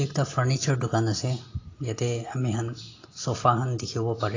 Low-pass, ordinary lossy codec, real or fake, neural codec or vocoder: 7.2 kHz; AAC, 32 kbps; real; none